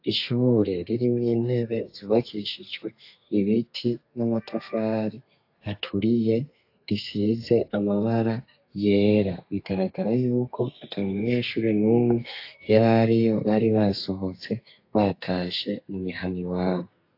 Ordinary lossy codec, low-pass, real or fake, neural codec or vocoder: AAC, 32 kbps; 5.4 kHz; fake; codec, 32 kHz, 1.9 kbps, SNAC